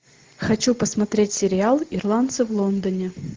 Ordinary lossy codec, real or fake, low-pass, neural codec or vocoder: Opus, 16 kbps; real; 7.2 kHz; none